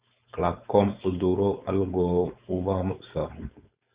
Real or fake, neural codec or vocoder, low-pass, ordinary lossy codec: fake; codec, 16 kHz, 4.8 kbps, FACodec; 3.6 kHz; Opus, 64 kbps